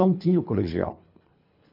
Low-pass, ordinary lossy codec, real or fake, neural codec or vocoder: 5.4 kHz; none; fake; codec, 24 kHz, 3 kbps, HILCodec